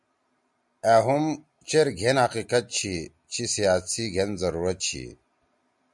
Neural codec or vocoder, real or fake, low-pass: none; real; 10.8 kHz